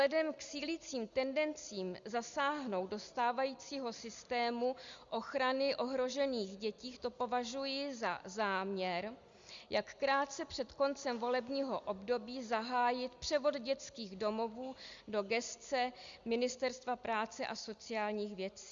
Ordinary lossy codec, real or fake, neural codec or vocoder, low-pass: Opus, 64 kbps; real; none; 7.2 kHz